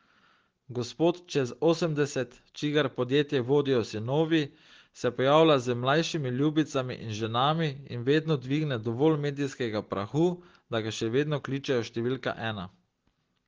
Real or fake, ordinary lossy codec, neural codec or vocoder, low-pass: real; Opus, 16 kbps; none; 7.2 kHz